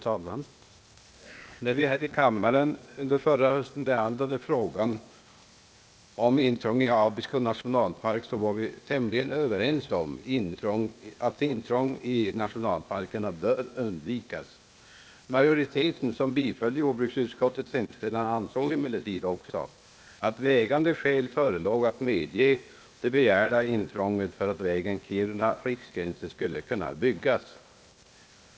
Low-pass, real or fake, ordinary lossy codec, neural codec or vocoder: none; fake; none; codec, 16 kHz, 0.8 kbps, ZipCodec